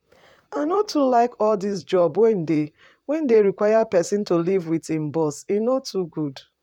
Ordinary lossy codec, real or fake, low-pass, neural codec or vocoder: none; fake; 19.8 kHz; vocoder, 44.1 kHz, 128 mel bands, Pupu-Vocoder